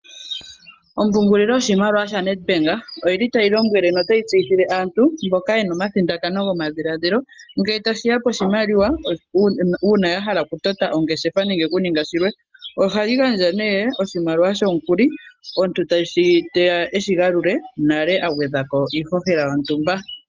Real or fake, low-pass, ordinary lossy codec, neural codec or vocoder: real; 7.2 kHz; Opus, 24 kbps; none